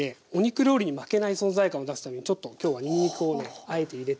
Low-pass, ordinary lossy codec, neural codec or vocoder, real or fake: none; none; none; real